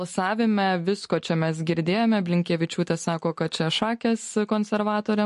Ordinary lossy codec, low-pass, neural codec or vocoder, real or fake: MP3, 48 kbps; 14.4 kHz; none; real